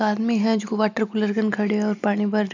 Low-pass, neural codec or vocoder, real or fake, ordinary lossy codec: 7.2 kHz; none; real; none